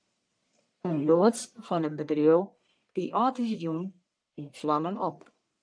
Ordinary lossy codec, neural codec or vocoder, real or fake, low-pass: MP3, 96 kbps; codec, 44.1 kHz, 1.7 kbps, Pupu-Codec; fake; 9.9 kHz